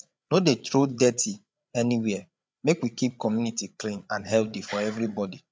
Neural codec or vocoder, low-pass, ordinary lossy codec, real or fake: codec, 16 kHz, 8 kbps, FreqCodec, larger model; none; none; fake